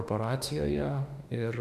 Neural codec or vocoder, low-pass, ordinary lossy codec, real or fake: autoencoder, 48 kHz, 32 numbers a frame, DAC-VAE, trained on Japanese speech; 14.4 kHz; MP3, 96 kbps; fake